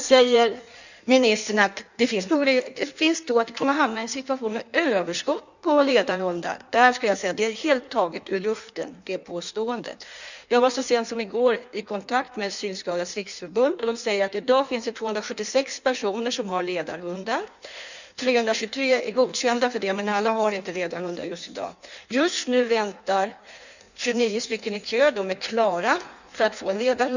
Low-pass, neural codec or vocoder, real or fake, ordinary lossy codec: 7.2 kHz; codec, 16 kHz in and 24 kHz out, 1.1 kbps, FireRedTTS-2 codec; fake; none